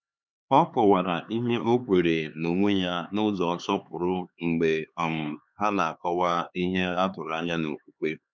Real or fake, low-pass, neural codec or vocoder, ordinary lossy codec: fake; none; codec, 16 kHz, 4 kbps, X-Codec, HuBERT features, trained on LibriSpeech; none